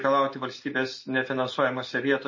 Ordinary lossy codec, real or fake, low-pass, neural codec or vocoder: MP3, 32 kbps; real; 7.2 kHz; none